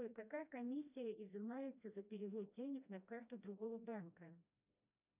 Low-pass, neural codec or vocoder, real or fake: 3.6 kHz; codec, 16 kHz, 1 kbps, FreqCodec, smaller model; fake